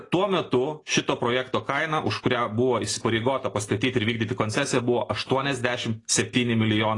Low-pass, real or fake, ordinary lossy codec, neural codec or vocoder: 10.8 kHz; real; AAC, 32 kbps; none